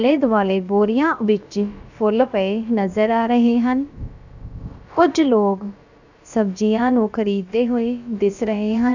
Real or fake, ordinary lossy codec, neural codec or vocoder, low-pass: fake; none; codec, 16 kHz, about 1 kbps, DyCAST, with the encoder's durations; 7.2 kHz